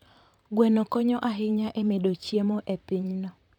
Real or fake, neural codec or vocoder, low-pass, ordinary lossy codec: fake; vocoder, 44.1 kHz, 128 mel bands, Pupu-Vocoder; 19.8 kHz; none